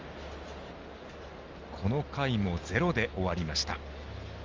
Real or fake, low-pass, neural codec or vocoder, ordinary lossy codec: real; 7.2 kHz; none; Opus, 32 kbps